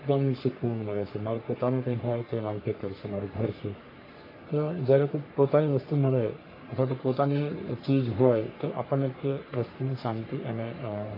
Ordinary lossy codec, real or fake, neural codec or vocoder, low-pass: AAC, 32 kbps; fake; codec, 44.1 kHz, 3.4 kbps, Pupu-Codec; 5.4 kHz